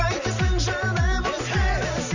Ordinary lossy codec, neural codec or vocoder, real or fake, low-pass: none; none; real; 7.2 kHz